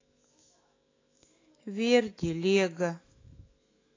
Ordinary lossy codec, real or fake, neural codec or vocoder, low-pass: AAC, 32 kbps; fake; autoencoder, 48 kHz, 128 numbers a frame, DAC-VAE, trained on Japanese speech; 7.2 kHz